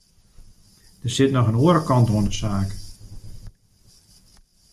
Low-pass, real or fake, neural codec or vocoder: 14.4 kHz; real; none